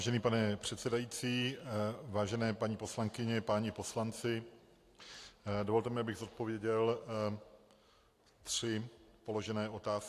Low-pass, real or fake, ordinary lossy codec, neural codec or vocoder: 14.4 kHz; real; AAC, 64 kbps; none